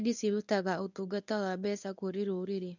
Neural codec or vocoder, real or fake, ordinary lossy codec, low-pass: codec, 24 kHz, 0.9 kbps, WavTokenizer, medium speech release version 1; fake; none; 7.2 kHz